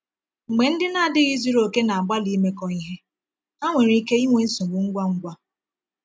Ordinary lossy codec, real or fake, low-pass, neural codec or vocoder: none; real; none; none